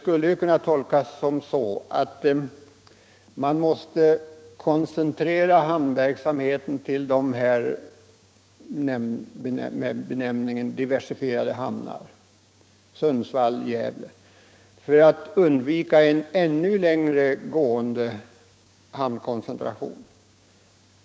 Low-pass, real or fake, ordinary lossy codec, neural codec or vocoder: none; fake; none; codec, 16 kHz, 6 kbps, DAC